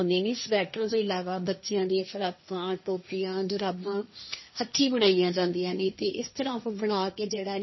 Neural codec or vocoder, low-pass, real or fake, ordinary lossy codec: codec, 16 kHz, 1.1 kbps, Voila-Tokenizer; 7.2 kHz; fake; MP3, 24 kbps